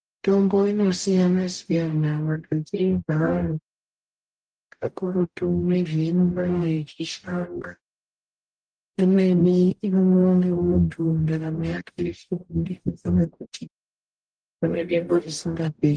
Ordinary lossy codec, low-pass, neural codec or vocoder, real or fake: Opus, 24 kbps; 9.9 kHz; codec, 44.1 kHz, 0.9 kbps, DAC; fake